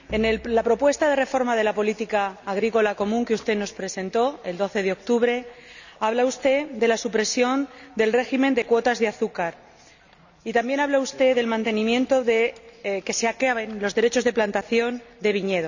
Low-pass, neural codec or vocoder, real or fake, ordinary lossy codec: 7.2 kHz; none; real; none